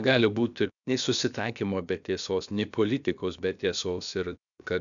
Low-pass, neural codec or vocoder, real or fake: 7.2 kHz; codec, 16 kHz, about 1 kbps, DyCAST, with the encoder's durations; fake